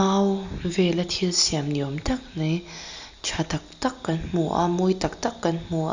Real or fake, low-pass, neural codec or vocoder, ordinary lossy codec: real; 7.2 kHz; none; Opus, 64 kbps